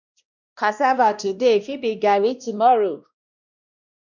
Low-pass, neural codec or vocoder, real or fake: 7.2 kHz; codec, 16 kHz, 1 kbps, X-Codec, WavLM features, trained on Multilingual LibriSpeech; fake